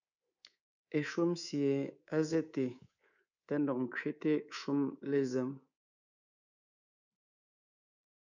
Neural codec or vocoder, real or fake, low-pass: codec, 24 kHz, 3.1 kbps, DualCodec; fake; 7.2 kHz